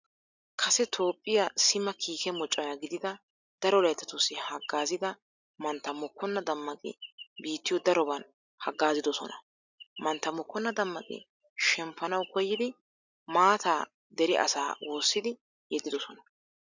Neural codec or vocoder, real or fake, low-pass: none; real; 7.2 kHz